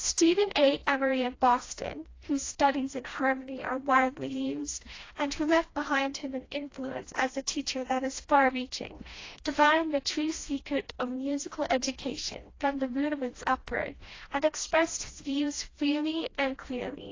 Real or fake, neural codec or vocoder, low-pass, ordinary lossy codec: fake; codec, 16 kHz, 1 kbps, FreqCodec, smaller model; 7.2 kHz; AAC, 32 kbps